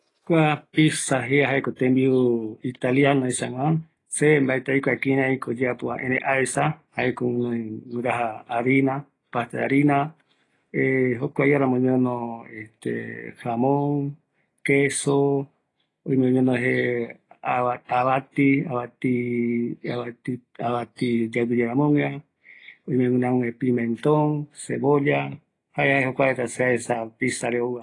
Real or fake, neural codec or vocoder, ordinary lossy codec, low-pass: real; none; AAC, 32 kbps; 10.8 kHz